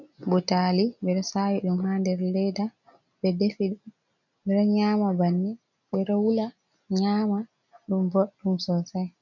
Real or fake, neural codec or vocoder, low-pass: real; none; 7.2 kHz